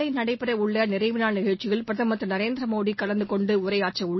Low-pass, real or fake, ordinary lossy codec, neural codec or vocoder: 7.2 kHz; real; MP3, 24 kbps; none